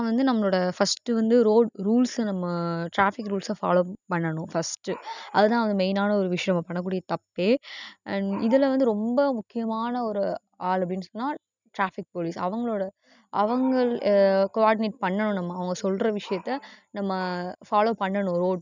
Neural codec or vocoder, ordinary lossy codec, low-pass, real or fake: none; none; 7.2 kHz; real